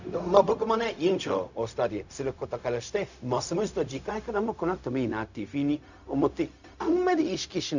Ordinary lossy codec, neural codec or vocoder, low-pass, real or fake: none; codec, 16 kHz, 0.4 kbps, LongCat-Audio-Codec; 7.2 kHz; fake